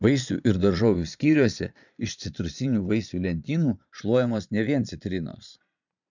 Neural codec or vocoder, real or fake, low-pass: vocoder, 22.05 kHz, 80 mel bands, Vocos; fake; 7.2 kHz